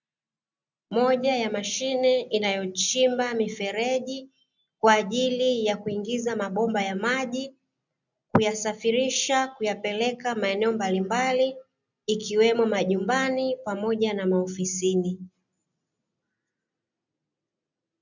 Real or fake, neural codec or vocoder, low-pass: real; none; 7.2 kHz